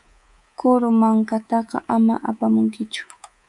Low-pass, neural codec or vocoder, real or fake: 10.8 kHz; codec, 24 kHz, 3.1 kbps, DualCodec; fake